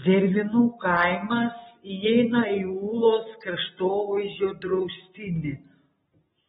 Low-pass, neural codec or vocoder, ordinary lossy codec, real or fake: 14.4 kHz; none; AAC, 16 kbps; real